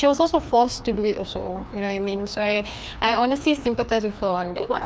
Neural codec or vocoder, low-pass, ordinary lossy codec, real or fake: codec, 16 kHz, 2 kbps, FreqCodec, larger model; none; none; fake